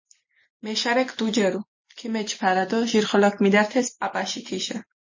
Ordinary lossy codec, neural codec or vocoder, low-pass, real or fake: MP3, 32 kbps; none; 7.2 kHz; real